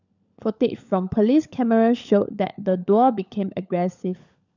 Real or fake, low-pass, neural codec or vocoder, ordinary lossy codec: fake; 7.2 kHz; codec, 16 kHz, 16 kbps, FunCodec, trained on LibriTTS, 50 frames a second; none